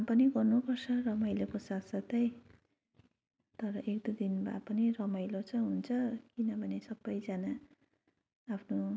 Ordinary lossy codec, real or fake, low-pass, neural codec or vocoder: none; real; none; none